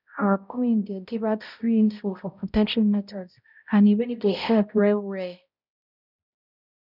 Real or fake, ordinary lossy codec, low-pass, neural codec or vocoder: fake; none; 5.4 kHz; codec, 16 kHz, 0.5 kbps, X-Codec, HuBERT features, trained on balanced general audio